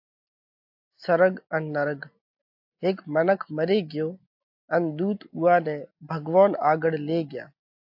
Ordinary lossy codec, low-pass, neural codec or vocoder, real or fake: AAC, 48 kbps; 5.4 kHz; none; real